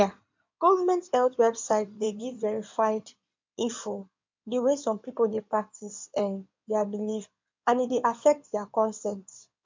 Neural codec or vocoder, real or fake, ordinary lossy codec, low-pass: codec, 16 kHz in and 24 kHz out, 2.2 kbps, FireRedTTS-2 codec; fake; MP3, 64 kbps; 7.2 kHz